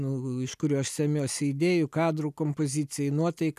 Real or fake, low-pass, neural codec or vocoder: real; 14.4 kHz; none